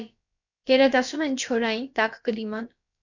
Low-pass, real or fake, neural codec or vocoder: 7.2 kHz; fake; codec, 16 kHz, about 1 kbps, DyCAST, with the encoder's durations